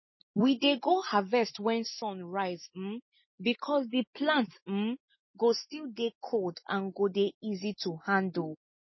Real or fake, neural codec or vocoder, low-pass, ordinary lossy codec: real; none; 7.2 kHz; MP3, 24 kbps